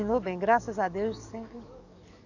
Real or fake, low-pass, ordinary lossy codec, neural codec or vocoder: fake; 7.2 kHz; none; codec, 44.1 kHz, 7.8 kbps, DAC